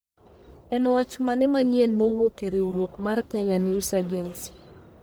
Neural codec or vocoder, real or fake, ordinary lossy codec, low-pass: codec, 44.1 kHz, 1.7 kbps, Pupu-Codec; fake; none; none